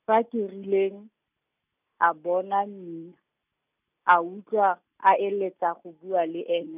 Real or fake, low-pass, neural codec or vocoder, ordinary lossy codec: real; 3.6 kHz; none; none